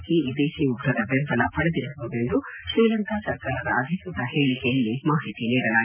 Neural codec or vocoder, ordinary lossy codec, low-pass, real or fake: none; none; 3.6 kHz; real